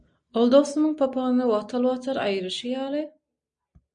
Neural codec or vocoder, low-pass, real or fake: none; 9.9 kHz; real